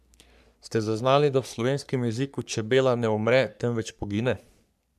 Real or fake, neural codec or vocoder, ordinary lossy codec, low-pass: fake; codec, 44.1 kHz, 3.4 kbps, Pupu-Codec; none; 14.4 kHz